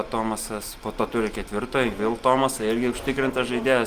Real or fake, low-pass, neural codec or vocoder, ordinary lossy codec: real; 14.4 kHz; none; Opus, 32 kbps